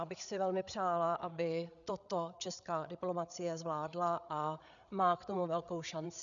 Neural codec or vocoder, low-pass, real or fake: codec, 16 kHz, 8 kbps, FreqCodec, larger model; 7.2 kHz; fake